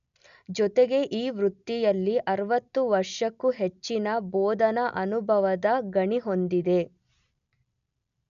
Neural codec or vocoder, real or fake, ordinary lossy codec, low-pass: none; real; none; 7.2 kHz